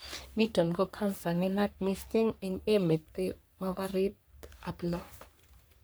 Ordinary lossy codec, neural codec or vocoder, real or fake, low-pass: none; codec, 44.1 kHz, 3.4 kbps, Pupu-Codec; fake; none